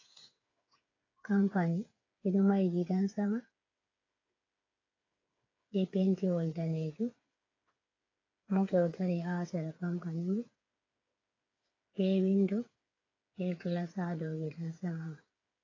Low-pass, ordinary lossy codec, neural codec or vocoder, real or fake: 7.2 kHz; AAC, 32 kbps; codec, 16 kHz, 8 kbps, FreqCodec, smaller model; fake